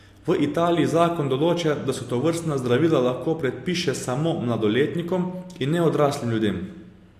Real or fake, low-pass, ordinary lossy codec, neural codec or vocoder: real; 14.4 kHz; AAC, 64 kbps; none